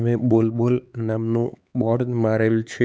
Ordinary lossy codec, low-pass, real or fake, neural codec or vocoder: none; none; fake; codec, 16 kHz, 4 kbps, X-Codec, HuBERT features, trained on LibriSpeech